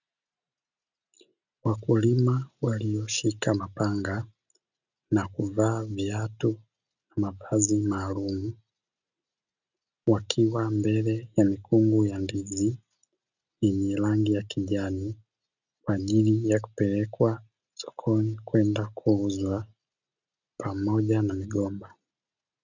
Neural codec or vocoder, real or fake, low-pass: none; real; 7.2 kHz